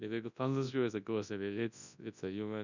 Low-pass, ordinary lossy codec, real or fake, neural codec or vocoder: 7.2 kHz; none; fake; codec, 24 kHz, 0.9 kbps, WavTokenizer, large speech release